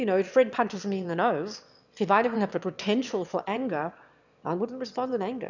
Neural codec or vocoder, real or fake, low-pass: autoencoder, 22.05 kHz, a latent of 192 numbers a frame, VITS, trained on one speaker; fake; 7.2 kHz